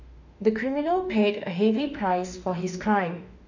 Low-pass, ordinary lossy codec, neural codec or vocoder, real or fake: 7.2 kHz; none; autoencoder, 48 kHz, 32 numbers a frame, DAC-VAE, trained on Japanese speech; fake